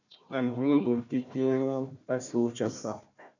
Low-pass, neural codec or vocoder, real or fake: 7.2 kHz; codec, 16 kHz, 1 kbps, FunCodec, trained on Chinese and English, 50 frames a second; fake